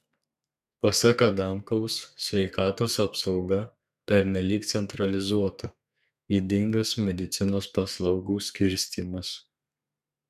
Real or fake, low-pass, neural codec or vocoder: fake; 14.4 kHz; codec, 32 kHz, 1.9 kbps, SNAC